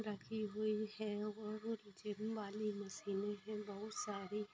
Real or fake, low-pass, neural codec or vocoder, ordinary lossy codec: real; none; none; none